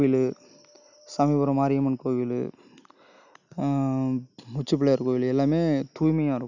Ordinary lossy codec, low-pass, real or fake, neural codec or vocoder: none; 7.2 kHz; real; none